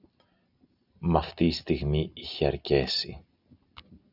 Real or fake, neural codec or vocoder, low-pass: real; none; 5.4 kHz